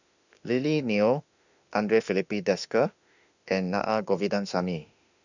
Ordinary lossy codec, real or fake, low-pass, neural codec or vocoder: none; fake; 7.2 kHz; autoencoder, 48 kHz, 32 numbers a frame, DAC-VAE, trained on Japanese speech